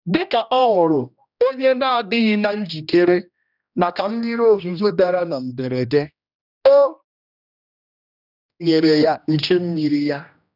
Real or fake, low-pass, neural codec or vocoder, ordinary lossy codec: fake; 5.4 kHz; codec, 16 kHz, 1 kbps, X-Codec, HuBERT features, trained on general audio; none